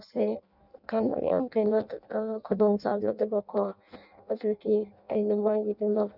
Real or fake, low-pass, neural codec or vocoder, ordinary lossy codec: fake; 5.4 kHz; codec, 16 kHz in and 24 kHz out, 0.6 kbps, FireRedTTS-2 codec; none